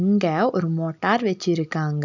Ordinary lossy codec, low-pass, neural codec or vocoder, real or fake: none; 7.2 kHz; none; real